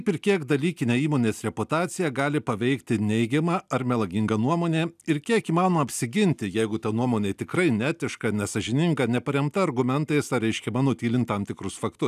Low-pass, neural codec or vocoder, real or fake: 14.4 kHz; none; real